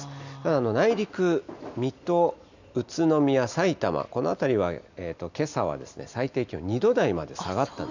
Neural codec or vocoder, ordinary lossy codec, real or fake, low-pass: none; none; real; 7.2 kHz